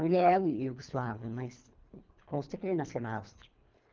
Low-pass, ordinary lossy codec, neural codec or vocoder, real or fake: 7.2 kHz; Opus, 24 kbps; codec, 24 kHz, 3 kbps, HILCodec; fake